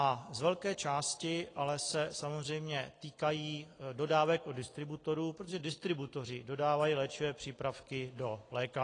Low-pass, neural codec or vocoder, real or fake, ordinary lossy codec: 9.9 kHz; none; real; AAC, 32 kbps